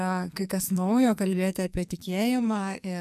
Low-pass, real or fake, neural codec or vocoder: 14.4 kHz; fake; codec, 44.1 kHz, 2.6 kbps, SNAC